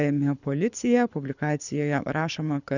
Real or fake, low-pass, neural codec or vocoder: fake; 7.2 kHz; codec, 24 kHz, 6 kbps, HILCodec